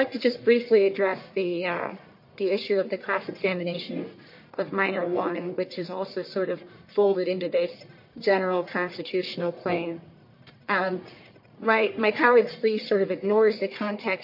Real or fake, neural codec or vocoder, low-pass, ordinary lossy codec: fake; codec, 44.1 kHz, 1.7 kbps, Pupu-Codec; 5.4 kHz; MP3, 32 kbps